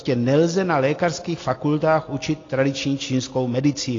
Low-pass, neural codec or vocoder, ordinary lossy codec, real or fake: 7.2 kHz; none; AAC, 32 kbps; real